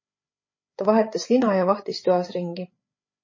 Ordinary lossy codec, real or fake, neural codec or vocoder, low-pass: MP3, 32 kbps; fake; codec, 16 kHz, 8 kbps, FreqCodec, larger model; 7.2 kHz